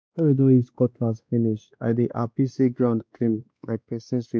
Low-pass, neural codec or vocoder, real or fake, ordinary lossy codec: none; codec, 16 kHz, 2 kbps, X-Codec, WavLM features, trained on Multilingual LibriSpeech; fake; none